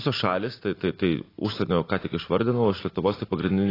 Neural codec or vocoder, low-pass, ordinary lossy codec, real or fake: none; 5.4 kHz; AAC, 24 kbps; real